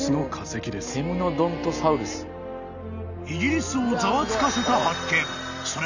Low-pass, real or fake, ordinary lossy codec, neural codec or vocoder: 7.2 kHz; real; none; none